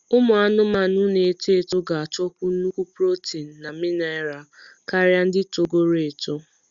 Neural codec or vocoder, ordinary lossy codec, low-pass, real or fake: none; Opus, 64 kbps; 7.2 kHz; real